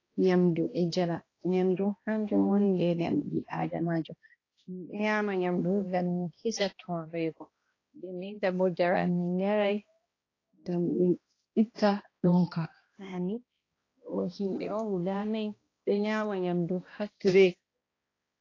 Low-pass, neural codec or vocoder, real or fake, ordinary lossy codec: 7.2 kHz; codec, 16 kHz, 1 kbps, X-Codec, HuBERT features, trained on balanced general audio; fake; AAC, 32 kbps